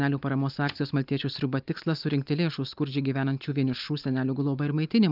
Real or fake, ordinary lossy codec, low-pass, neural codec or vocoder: real; Opus, 24 kbps; 5.4 kHz; none